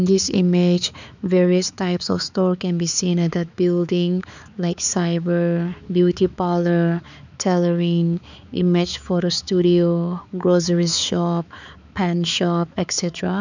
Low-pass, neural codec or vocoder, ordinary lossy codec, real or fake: 7.2 kHz; codec, 16 kHz, 4 kbps, X-Codec, HuBERT features, trained on balanced general audio; none; fake